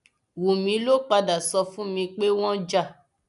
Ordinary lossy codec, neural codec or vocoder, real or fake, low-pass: none; none; real; 10.8 kHz